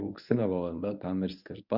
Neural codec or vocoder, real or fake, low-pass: codec, 24 kHz, 0.9 kbps, WavTokenizer, medium speech release version 1; fake; 5.4 kHz